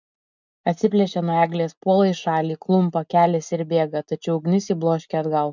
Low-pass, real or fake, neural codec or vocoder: 7.2 kHz; real; none